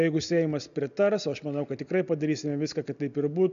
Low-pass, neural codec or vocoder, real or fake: 7.2 kHz; none; real